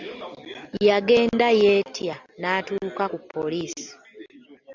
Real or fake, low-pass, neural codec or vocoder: real; 7.2 kHz; none